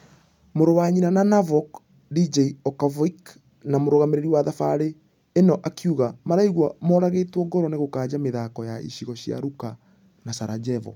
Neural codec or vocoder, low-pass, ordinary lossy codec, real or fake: none; 19.8 kHz; none; real